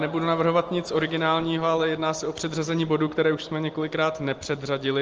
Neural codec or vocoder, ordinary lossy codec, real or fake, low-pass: none; Opus, 24 kbps; real; 7.2 kHz